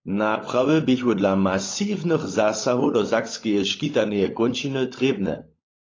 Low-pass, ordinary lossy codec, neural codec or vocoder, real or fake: 7.2 kHz; AAC, 32 kbps; codec, 16 kHz, 16 kbps, FunCodec, trained on LibriTTS, 50 frames a second; fake